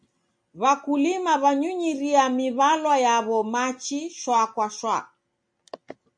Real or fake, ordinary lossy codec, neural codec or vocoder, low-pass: real; MP3, 48 kbps; none; 9.9 kHz